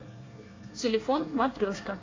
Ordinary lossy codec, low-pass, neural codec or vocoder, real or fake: AAC, 32 kbps; 7.2 kHz; codec, 24 kHz, 1 kbps, SNAC; fake